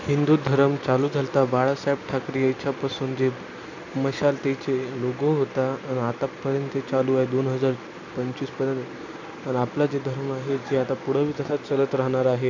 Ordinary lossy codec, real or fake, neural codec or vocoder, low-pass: AAC, 48 kbps; real; none; 7.2 kHz